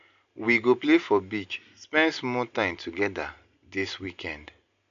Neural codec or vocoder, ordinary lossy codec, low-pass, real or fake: none; MP3, 64 kbps; 7.2 kHz; real